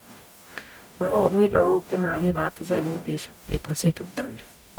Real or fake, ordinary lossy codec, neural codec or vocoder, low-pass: fake; none; codec, 44.1 kHz, 0.9 kbps, DAC; none